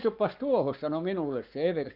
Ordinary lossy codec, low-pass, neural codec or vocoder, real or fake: Opus, 24 kbps; 5.4 kHz; codec, 44.1 kHz, 7.8 kbps, Pupu-Codec; fake